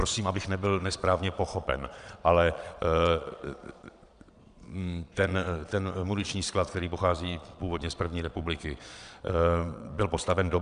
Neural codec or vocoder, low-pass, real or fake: vocoder, 22.05 kHz, 80 mel bands, Vocos; 9.9 kHz; fake